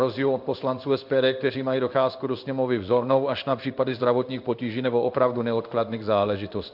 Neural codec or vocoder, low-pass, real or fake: codec, 16 kHz in and 24 kHz out, 1 kbps, XY-Tokenizer; 5.4 kHz; fake